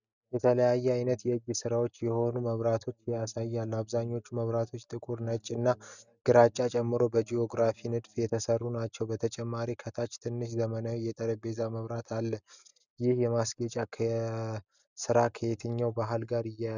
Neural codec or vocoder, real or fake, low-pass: none; real; 7.2 kHz